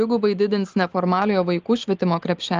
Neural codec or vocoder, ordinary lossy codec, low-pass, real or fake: none; Opus, 24 kbps; 7.2 kHz; real